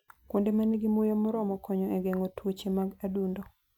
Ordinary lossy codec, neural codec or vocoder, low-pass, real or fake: none; none; 19.8 kHz; real